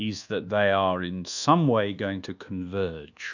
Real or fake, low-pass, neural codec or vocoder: fake; 7.2 kHz; codec, 24 kHz, 1.2 kbps, DualCodec